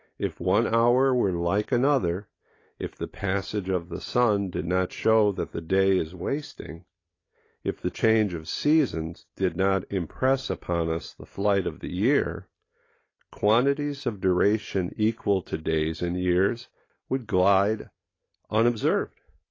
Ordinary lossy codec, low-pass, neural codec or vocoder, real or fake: AAC, 32 kbps; 7.2 kHz; none; real